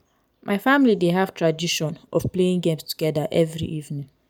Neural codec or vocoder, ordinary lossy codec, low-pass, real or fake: none; none; none; real